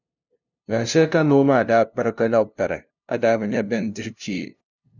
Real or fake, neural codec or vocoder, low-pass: fake; codec, 16 kHz, 0.5 kbps, FunCodec, trained on LibriTTS, 25 frames a second; 7.2 kHz